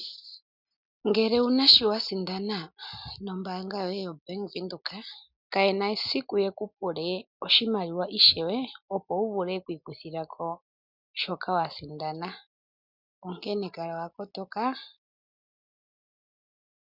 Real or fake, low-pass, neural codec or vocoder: real; 5.4 kHz; none